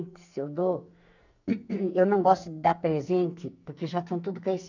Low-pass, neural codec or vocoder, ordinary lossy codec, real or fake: 7.2 kHz; codec, 44.1 kHz, 2.6 kbps, SNAC; none; fake